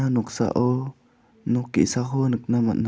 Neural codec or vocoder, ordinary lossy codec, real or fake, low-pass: none; none; real; none